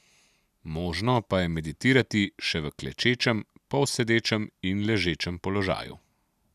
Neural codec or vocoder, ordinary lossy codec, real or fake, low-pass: none; none; real; 14.4 kHz